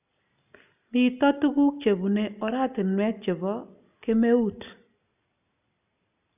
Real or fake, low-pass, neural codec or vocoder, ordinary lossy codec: real; 3.6 kHz; none; none